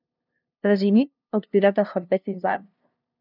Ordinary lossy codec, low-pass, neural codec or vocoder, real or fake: none; 5.4 kHz; codec, 16 kHz, 0.5 kbps, FunCodec, trained on LibriTTS, 25 frames a second; fake